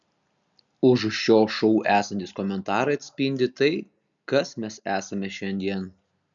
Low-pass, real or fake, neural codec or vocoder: 7.2 kHz; real; none